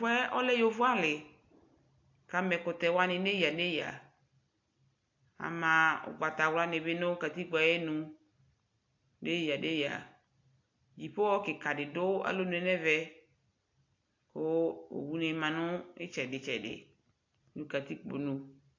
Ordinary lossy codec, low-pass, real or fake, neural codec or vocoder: AAC, 48 kbps; 7.2 kHz; real; none